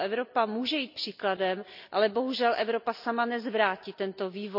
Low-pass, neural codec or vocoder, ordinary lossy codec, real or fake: 5.4 kHz; none; none; real